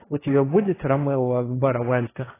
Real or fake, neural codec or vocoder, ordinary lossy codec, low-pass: fake; codec, 16 kHz, 1.1 kbps, Voila-Tokenizer; AAC, 16 kbps; 3.6 kHz